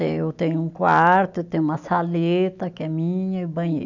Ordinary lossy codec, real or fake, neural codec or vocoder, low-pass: none; real; none; 7.2 kHz